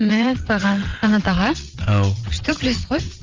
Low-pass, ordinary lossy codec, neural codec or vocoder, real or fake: 7.2 kHz; Opus, 32 kbps; vocoder, 22.05 kHz, 80 mel bands, WaveNeXt; fake